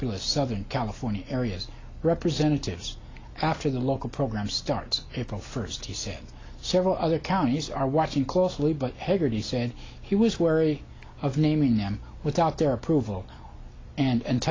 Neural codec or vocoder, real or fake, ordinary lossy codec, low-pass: none; real; AAC, 32 kbps; 7.2 kHz